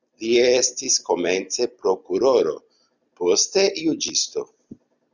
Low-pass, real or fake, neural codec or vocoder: 7.2 kHz; real; none